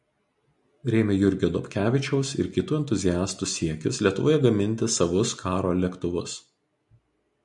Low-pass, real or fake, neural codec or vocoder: 10.8 kHz; real; none